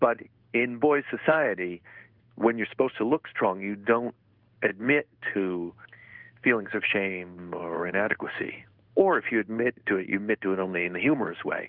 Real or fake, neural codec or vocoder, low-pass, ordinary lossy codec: fake; codec, 16 kHz in and 24 kHz out, 1 kbps, XY-Tokenizer; 5.4 kHz; Opus, 32 kbps